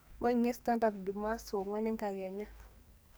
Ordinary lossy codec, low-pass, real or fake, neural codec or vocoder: none; none; fake; codec, 44.1 kHz, 2.6 kbps, SNAC